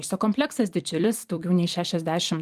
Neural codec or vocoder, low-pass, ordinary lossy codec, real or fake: vocoder, 44.1 kHz, 128 mel bands every 256 samples, BigVGAN v2; 14.4 kHz; Opus, 32 kbps; fake